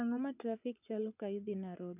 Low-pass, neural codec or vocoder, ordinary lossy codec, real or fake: 3.6 kHz; vocoder, 24 kHz, 100 mel bands, Vocos; none; fake